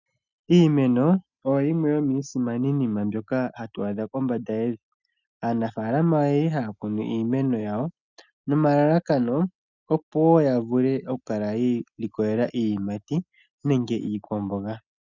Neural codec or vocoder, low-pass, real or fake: none; 7.2 kHz; real